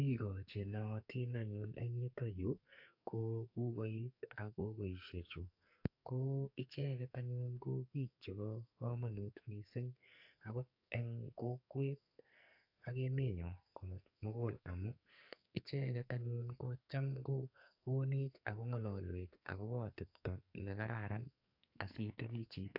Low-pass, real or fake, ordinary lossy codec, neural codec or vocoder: 5.4 kHz; fake; AAC, 48 kbps; codec, 44.1 kHz, 2.6 kbps, SNAC